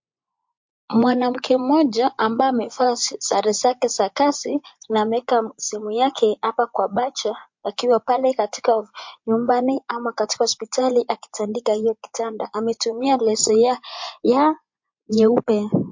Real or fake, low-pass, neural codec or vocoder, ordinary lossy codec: fake; 7.2 kHz; vocoder, 24 kHz, 100 mel bands, Vocos; MP3, 48 kbps